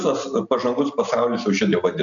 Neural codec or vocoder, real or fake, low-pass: none; real; 7.2 kHz